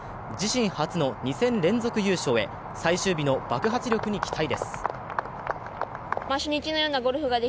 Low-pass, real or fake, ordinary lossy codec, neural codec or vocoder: none; real; none; none